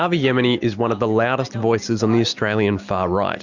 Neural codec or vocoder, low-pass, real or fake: none; 7.2 kHz; real